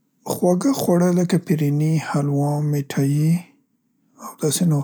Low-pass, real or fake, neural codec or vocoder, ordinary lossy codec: none; real; none; none